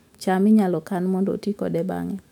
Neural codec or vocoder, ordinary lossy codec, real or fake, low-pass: autoencoder, 48 kHz, 128 numbers a frame, DAC-VAE, trained on Japanese speech; none; fake; 19.8 kHz